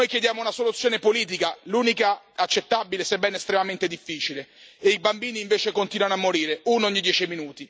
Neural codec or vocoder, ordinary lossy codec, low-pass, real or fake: none; none; none; real